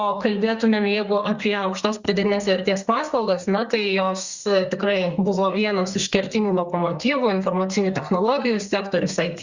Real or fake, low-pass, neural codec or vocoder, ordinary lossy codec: fake; 7.2 kHz; codec, 32 kHz, 1.9 kbps, SNAC; Opus, 64 kbps